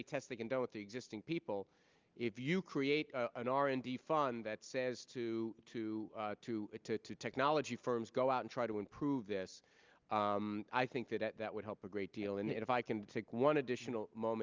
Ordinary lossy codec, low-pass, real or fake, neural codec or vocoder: Opus, 32 kbps; 7.2 kHz; real; none